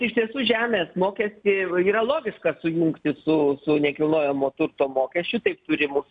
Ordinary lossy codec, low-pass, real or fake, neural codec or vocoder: MP3, 96 kbps; 9.9 kHz; real; none